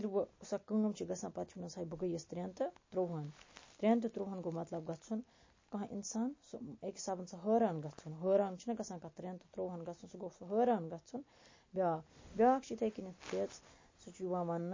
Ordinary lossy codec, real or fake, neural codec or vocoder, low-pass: MP3, 32 kbps; real; none; 7.2 kHz